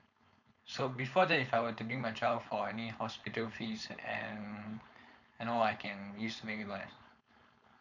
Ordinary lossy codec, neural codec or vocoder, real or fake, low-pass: none; codec, 16 kHz, 4.8 kbps, FACodec; fake; 7.2 kHz